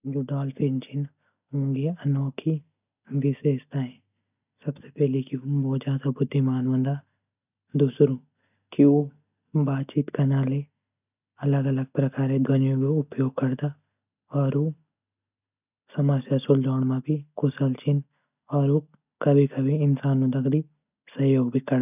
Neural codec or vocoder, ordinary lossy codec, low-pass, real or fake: none; none; 3.6 kHz; real